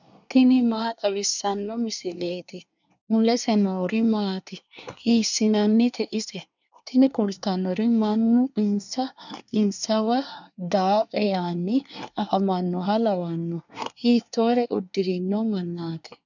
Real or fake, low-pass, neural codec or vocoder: fake; 7.2 kHz; codec, 24 kHz, 1 kbps, SNAC